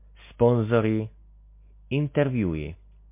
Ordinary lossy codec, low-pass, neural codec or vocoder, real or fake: MP3, 24 kbps; 3.6 kHz; none; real